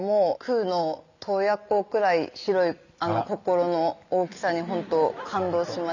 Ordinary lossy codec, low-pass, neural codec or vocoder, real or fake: none; 7.2 kHz; none; real